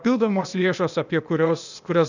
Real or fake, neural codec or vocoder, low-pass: fake; codec, 16 kHz, 0.8 kbps, ZipCodec; 7.2 kHz